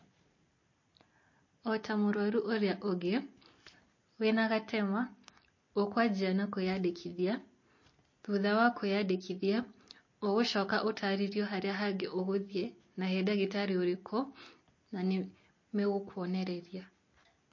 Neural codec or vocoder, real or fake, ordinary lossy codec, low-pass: none; real; MP3, 32 kbps; 7.2 kHz